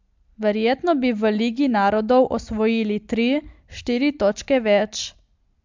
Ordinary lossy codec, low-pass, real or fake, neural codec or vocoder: MP3, 64 kbps; 7.2 kHz; real; none